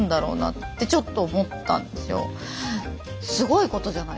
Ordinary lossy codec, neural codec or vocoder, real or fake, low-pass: none; none; real; none